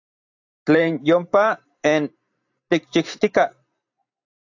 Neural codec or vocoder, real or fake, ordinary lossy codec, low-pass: vocoder, 44.1 kHz, 128 mel bands every 512 samples, BigVGAN v2; fake; AAC, 48 kbps; 7.2 kHz